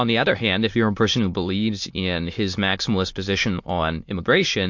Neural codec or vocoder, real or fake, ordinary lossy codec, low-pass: autoencoder, 22.05 kHz, a latent of 192 numbers a frame, VITS, trained on many speakers; fake; MP3, 48 kbps; 7.2 kHz